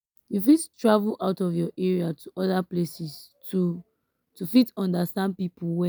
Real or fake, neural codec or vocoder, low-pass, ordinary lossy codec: real; none; none; none